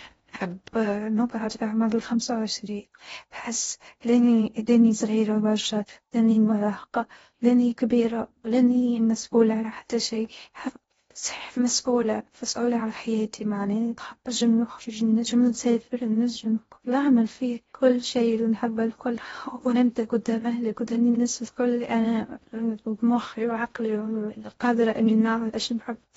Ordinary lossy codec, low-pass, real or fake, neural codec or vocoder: AAC, 24 kbps; 10.8 kHz; fake; codec, 16 kHz in and 24 kHz out, 0.6 kbps, FocalCodec, streaming, 2048 codes